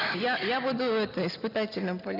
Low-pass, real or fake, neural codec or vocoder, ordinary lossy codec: 5.4 kHz; real; none; none